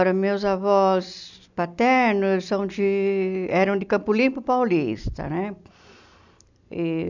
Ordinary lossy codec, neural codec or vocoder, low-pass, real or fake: none; none; 7.2 kHz; real